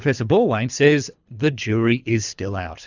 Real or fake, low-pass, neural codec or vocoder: fake; 7.2 kHz; codec, 24 kHz, 3 kbps, HILCodec